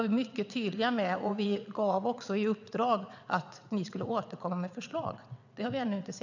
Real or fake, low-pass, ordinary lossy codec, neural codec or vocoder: fake; 7.2 kHz; none; vocoder, 22.05 kHz, 80 mel bands, WaveNeXt